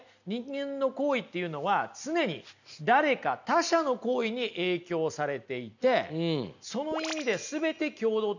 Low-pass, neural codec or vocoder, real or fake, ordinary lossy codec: 7.2 kHz; none; real; none